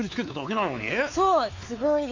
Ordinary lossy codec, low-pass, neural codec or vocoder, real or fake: AAC, 48 kbps; 7.2 kHz; codec, 16 kHz, 4 kbps, X-Codec, WavLM features, trained on Multilingual LibriSpeech; fake